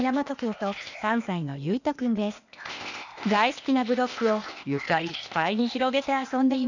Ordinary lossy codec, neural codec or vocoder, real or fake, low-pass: none; codec, 16 kHz, 0.8 kbps, ZipCodec; fake; 7.2 kHz